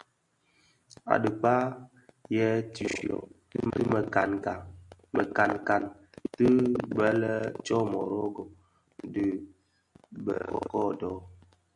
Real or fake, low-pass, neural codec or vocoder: real; 10.8 kHz; none